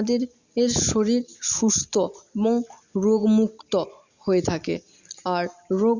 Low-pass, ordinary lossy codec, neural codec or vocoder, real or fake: 7.2 kHz; Opus, 64 kbps; none; real